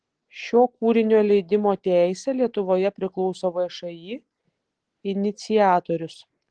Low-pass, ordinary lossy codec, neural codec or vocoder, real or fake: 9.9 kHz; Opus, 16 kbps; none; real